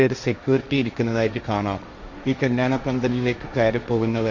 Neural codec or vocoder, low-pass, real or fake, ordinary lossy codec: codec, 16 kHz, 1.1 kbps, Voila-Tokenizer; 7.2 kHz; fake; none